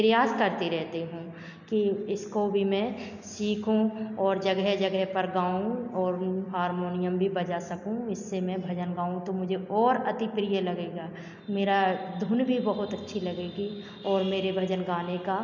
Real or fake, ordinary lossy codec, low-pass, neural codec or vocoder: real; none; 7.2 kHz; none